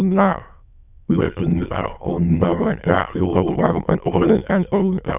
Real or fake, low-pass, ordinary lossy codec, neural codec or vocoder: fake; 3.6 kHz; none; autoencoder, 22.05 kHz, a latent of 192 numbers a frame, VITS, trained on many speakers